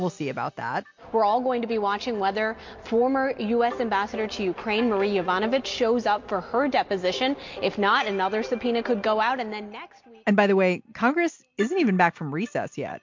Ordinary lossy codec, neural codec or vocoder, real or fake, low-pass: MP3, 48 kbps; none; real; 7.2 kHz